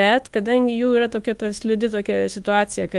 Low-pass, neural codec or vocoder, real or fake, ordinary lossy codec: 10.8 kHz; codec, 24 kHz, 1.2 kbps, DualCodec; fake; Opus, 24 kbps